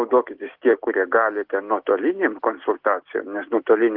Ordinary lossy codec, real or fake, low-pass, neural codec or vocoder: Opus, 16 kbps; real; 5.4 kHz; none